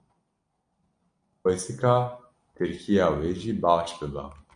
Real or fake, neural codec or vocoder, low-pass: real; none; 9.9 kHz